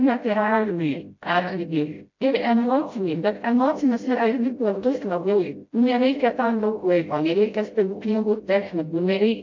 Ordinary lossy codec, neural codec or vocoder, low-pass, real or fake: MP3, 48 kbps; codec, 16 kHz, 0.5 kbps, FreqCodec, smaller model; 7.2 kHz; fake